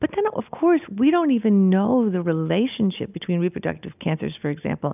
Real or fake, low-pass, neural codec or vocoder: real; 3.6 kHz; none